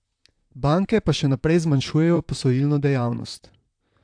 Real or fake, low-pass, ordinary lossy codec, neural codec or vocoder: fake; 9.9 kHz; AAC, 64 kbps; vocoder, 44.1 kHz, 128 mel bands, Pupu-Vocoder